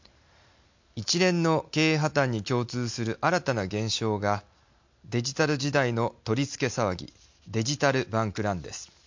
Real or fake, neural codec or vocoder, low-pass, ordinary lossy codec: real; none; 7.2 kHz; MP3, 48 kbps